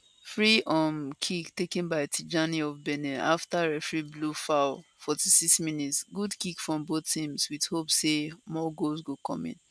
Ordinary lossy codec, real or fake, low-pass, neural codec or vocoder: none; real; none; none